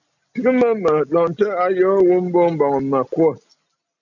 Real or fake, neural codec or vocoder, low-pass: real; none; 7.2 kHz